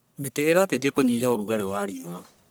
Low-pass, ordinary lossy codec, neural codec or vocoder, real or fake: none; none; codec, 44.1 kHz, 1.7 kbps, Pupu-Codec; fake